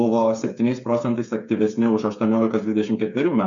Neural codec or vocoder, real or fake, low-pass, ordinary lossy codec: codec, 16 kHz, 8 kbps, FreqCodec, smaller model; fake; 7.2 kHz; MP3, 64 kbps